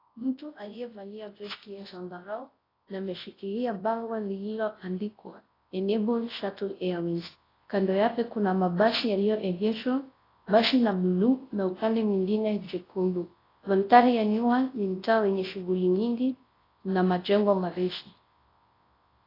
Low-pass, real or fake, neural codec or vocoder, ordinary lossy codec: 5.4 kHz; fake; codec, 24 kHz, 0.9 kbps, WavTokenizer, large speech release; AAC, 24 kbps